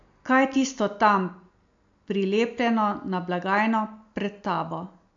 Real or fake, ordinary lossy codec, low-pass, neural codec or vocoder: real; AAC, 64 kbps; 7.2 kHz; none